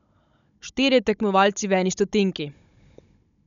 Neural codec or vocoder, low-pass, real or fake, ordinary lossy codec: codec, 16 kHz, 16 kbps, FunCodec, trained on Chinese and English, 50 frames a second; 7.2 kHz; fake; none